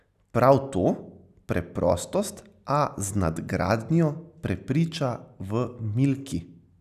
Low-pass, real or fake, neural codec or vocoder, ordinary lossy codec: 14.4 kHz; real; none; none